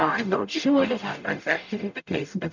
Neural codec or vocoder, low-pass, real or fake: codec, 44.1 kHz, 0.9 kbps, DAC; 7.2 kHz; fake